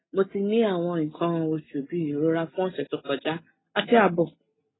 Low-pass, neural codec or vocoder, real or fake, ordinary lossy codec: 7.2 kHz; none; real; AAC, 16 kbps